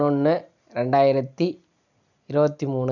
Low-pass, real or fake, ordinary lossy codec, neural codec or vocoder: 7.2 kHz; real; none; none